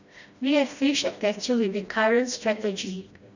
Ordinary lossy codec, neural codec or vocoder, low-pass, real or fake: none; codec, 16 kHz, 1 kbps, FreqCodec, smaller model; 7.2 kHz; fake